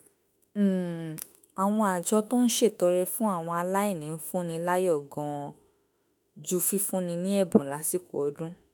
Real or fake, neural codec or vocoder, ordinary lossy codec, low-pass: fake; autoencoder, 48 kHz, 32 numbers a frame, DAC-VAE, trained on Japanese speech; none; none